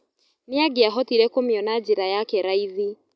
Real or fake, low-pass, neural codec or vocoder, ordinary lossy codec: real; none; none; none